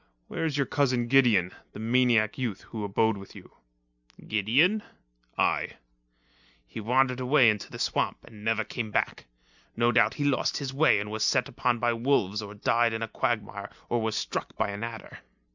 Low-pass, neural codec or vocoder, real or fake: 7.2 kHz; none; real